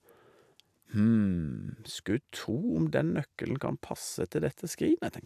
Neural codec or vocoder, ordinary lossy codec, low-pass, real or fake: none; AAC, 96 kbps; 14.4 kHz; real